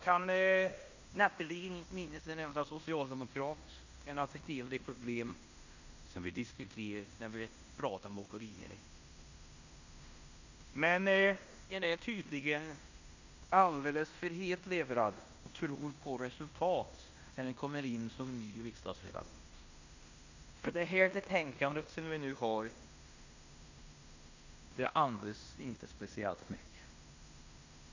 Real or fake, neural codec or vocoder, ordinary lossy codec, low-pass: fake; codec, 16 kHz in and 24 kHz out, 0.9 kbps, LongCat-Audio-Codec, fine tuned four codebook decoder; Opus, 64 kbps; 7.2 kHz